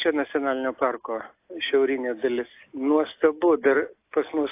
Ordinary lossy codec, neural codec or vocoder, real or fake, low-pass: AAC, 24 kbps; none; real; 3.6 kHz